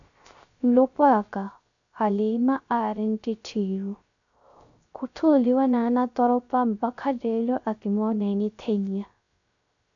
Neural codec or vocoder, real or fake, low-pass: codec, 16 kHz, 0.3 kbps, FocalCodec; fake; 7.2 kHz